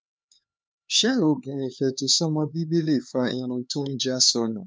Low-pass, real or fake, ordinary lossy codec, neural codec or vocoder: none; fake; none; codec, 16 kHz, 4 kbps, X-Codec, HuBERT features, trained on LibriSpeech